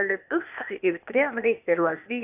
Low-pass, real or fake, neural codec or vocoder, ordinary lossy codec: 3.6 kHz; fake; codec, 16 kHz, 0.8 kbps, ZipCodec; AAC, 32 kbps